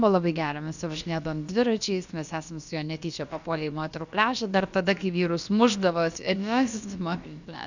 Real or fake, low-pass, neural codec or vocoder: fake; 7.2 kHz; codec, 16 kHz, about 1 kbps, DyCAST, with the encoder's durations